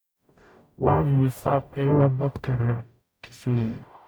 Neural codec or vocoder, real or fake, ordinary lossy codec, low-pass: codec, 44.1 kHz, 0.9 kbps, DAC; fake; none; none